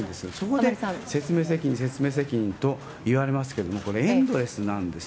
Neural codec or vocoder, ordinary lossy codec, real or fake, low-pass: none; none; real; none